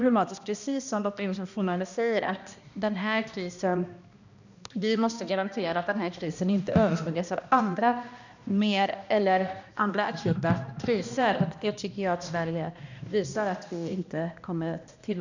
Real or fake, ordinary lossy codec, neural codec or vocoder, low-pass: fake; none; codec, 16 kHz, 1 kbps, X-Codec, HuBERT features, trained on balanced general audio; 7.2 kHz